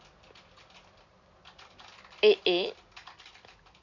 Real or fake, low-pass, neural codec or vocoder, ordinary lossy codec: real; 7.2 kHz; none; MP3, 64 kbps